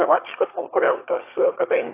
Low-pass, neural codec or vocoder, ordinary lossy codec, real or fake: 3.6 kHz; autoencoder, 22.05 kHz, a latent of 192 numbers a frame, VITS, trained on one speaker; AAC, 24 kbps; fake